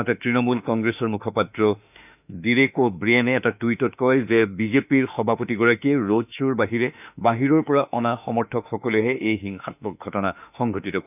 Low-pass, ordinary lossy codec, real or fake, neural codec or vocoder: 3.6 kHz; none; fake; autoencoder, 48 kHz, 32 numbers a frame, DAC-VAE, trained on Japanese speech